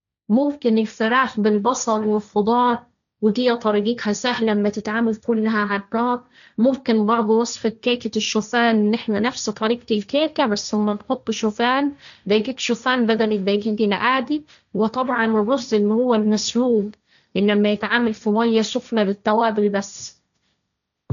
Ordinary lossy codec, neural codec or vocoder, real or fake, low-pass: none; codec, 16 kHz, 1.1 kbps, Voila-Tokenizer; fake; 7.2 kHz